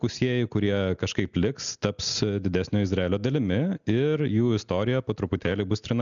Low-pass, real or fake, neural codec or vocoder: 7.2 kHz; real; none